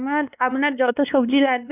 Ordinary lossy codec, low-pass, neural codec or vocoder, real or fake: none; 3.6 kHz; codec, 16 kHz, 2 kbps, X-Codec, WavLM features, trained on Multilingual LibriSpeech; fake